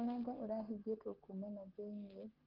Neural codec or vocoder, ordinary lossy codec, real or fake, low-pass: codec, 16 kHz, 8 kbps, FreqCodec, smaller model; Opus, 16 kbps; fake; 5.4 kHz